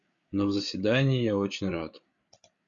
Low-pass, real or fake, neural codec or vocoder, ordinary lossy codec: 7.2 kHz; fake; codec, 16 kHz, 8 kbps, FreqCodec, larger model; Opus, 64 kbps